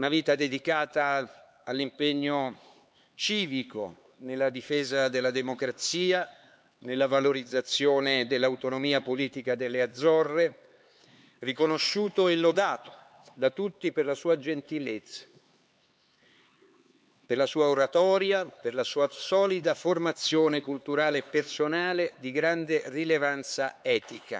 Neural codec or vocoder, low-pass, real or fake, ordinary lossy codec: codec, 16 kHz, 4 kbps, X-Codec, HuBERT features, trained on LibriSpeech; none; fake; none